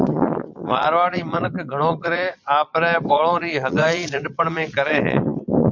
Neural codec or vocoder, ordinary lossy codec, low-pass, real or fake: vocoder, 22.05 kHz, 80 mel bands, Vocos; MP3, 64 kbps; 7.2 kHz; fake